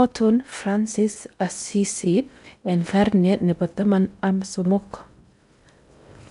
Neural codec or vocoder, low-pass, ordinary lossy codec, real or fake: codec, 16 kHz in and 24 kHz out, 0.6 kbps, FocalCodec, streaming, 4096 codes; 10.8 kHz; none; fake